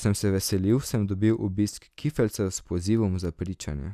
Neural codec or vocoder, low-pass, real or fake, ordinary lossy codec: none; 14.4 kHz; real; none